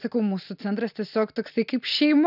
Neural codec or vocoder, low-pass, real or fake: none; 5.4 kHz; real